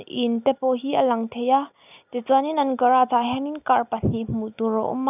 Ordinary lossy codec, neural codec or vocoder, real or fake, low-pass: none; none; real; 3.6 kHz